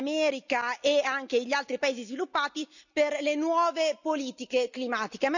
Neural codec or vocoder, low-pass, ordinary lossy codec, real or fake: none; 7.2 kHz; none; real